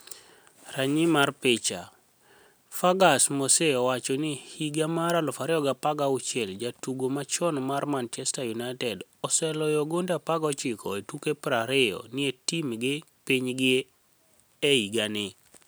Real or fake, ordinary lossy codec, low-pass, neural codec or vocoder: real; none; none; none